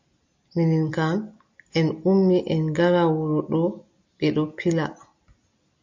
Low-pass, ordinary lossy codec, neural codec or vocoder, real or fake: 7.2 kHz; MP3, 48 kbps; none; real